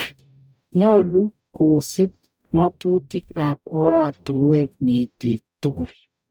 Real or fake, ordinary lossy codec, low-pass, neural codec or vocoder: fake; none; none; codec, 44.1 kHz, 0.9 kbps, DAC